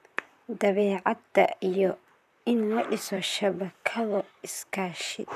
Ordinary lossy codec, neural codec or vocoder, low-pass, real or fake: none; vocoder, 44.1 kHz, 128 mel bands, Pupu-Vocoder; 14.4 kHz; fake